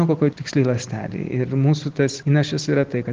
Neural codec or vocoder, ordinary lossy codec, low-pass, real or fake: none; Opus, 16 kbps; 7.2 kHz; real